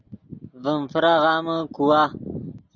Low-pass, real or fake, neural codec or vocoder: 7.2 kHz; real; none